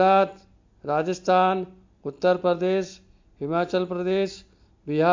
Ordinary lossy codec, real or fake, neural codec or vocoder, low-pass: MP3, 48 kbps; real; none; 7.2 kHz